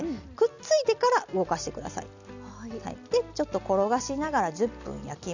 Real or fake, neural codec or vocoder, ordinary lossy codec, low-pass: real; none; none; 7.2 kHz